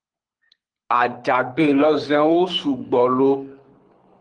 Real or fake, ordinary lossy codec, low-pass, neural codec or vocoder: fake; Opus, 24 kbps; 9.9 kHz; codec, 24 kHz, 6 kbps, HILCodec